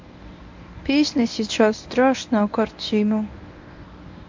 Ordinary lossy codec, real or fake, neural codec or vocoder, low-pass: MP3, 48 kbps; fake; codec, 24 kHz, 0.9 kbps, WavTokenizer, medium speech release version 1; 7.2 kHz